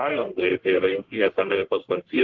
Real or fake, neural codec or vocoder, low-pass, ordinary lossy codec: fake; codec, 44.1 kHz, 1.7 kbps, Pupu-Codec; 7.2 kHz; Opus, 16 kbps